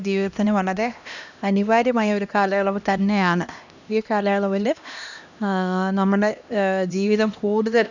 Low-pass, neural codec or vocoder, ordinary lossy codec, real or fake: 7.2 kHz; codec, 16 kHz, 1 kbps, X-Codec, HuBERT features, trained on LibriSpeech; none; fake